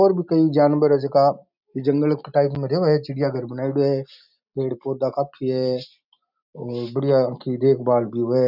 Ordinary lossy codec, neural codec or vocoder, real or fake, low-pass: none; none; real; 5.4 kHz